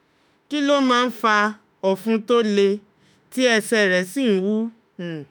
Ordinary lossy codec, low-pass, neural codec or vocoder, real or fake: none; none; autoencoder, 48 kHz, 32 numbers a frame, DAC-VAE, trained on Japanese speech; fake